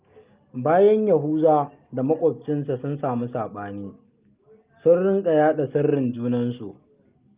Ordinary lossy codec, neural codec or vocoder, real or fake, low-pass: Opus, 32 kbps; none; real; 3.6 kHz